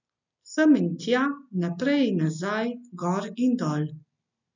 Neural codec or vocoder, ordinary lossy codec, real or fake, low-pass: none; none; real; 7.2 kHz